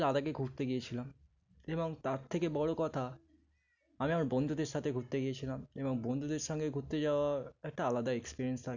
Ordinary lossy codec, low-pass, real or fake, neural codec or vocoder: none; 7.2 kHz; real; none